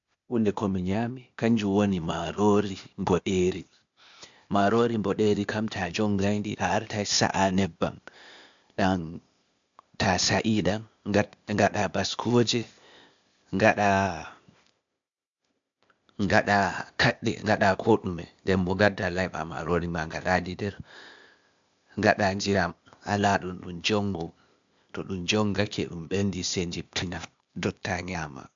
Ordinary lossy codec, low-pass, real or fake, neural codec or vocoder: MP3, 64 kbps; 7.2 kHz; fake; codec, 16 kHz, 0.8 kbps, ZipCodec